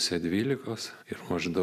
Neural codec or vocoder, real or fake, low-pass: none; real; 14.4 kHz